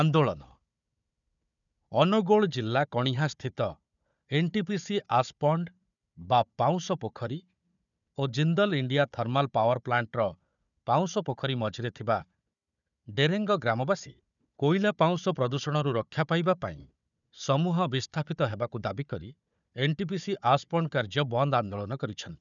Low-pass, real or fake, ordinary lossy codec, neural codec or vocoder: 7.2 kHz; fake; none; codec, 16 kHz, 4 kbps, FunCodec, trained on Chinese and English, 50 frames a second